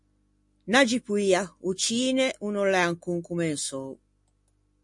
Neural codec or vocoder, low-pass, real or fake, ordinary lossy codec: none; 10.8 kHz; real; MP3, 48 kbps